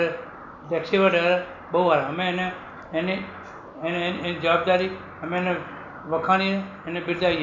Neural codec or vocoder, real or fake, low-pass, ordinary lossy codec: none; real; 7.2 kHz; none